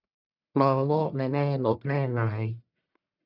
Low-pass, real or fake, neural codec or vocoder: 5.4 kHz; fake; codec, 44.1 kHz, 1.7 kbps, Pupu-Codec